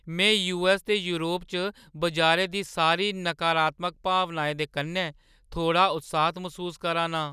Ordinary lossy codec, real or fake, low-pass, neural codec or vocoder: none; real; 14.4 kHz; none